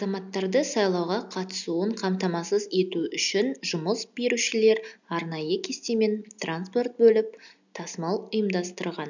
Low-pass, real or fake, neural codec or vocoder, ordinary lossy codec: 7.2 kHz; real; none; none